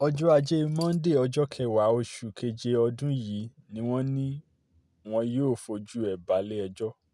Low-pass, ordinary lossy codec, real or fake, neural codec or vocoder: none; none; real; none